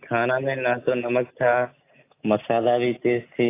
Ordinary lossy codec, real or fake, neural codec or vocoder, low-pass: AAC, 24 kbps; real; none; 3.6 kHz